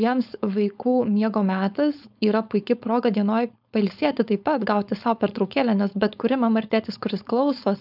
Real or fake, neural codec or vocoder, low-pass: fake; codec, 16 kHz, 4.8 kbps, FACodec; 5.4 kHz